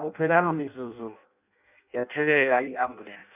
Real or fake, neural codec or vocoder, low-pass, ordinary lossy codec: fake; codec, 16 kHz in and 24 kHz out, 0.6 kbps, FireRedTTS-2 codec; 3.6 kHz; none